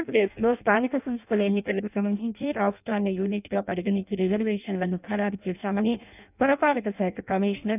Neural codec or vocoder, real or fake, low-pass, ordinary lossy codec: codec, 16 kHz in and 24 kHz out, 0.6 kbps, FireRedTTS-2 codec; fake; 3.6 kHz; none